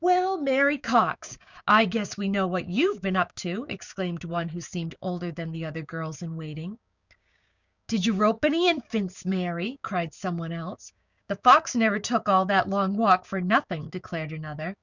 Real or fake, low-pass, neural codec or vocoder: fake; 7.2 kHz; codec, 16 kHz, 6 kbps, DAC